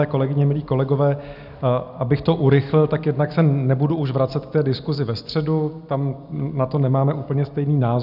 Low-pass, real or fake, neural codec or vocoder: 5.4 kHz; real; none